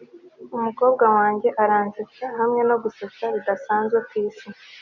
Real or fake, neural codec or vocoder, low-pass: real; none; 7.2 kHz